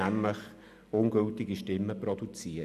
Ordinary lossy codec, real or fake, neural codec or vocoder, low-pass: MP3, 96 kbps; real; none; 14.4 kHz